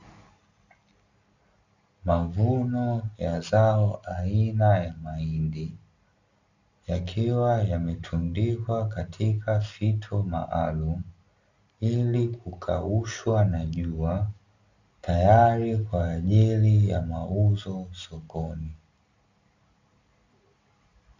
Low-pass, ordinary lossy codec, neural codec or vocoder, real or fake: 7.2 kHz; Opus, 64 kbps; none; real